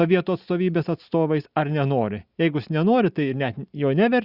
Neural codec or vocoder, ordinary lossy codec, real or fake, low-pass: none; Opus, 64 kbps; real; 5.4 kHz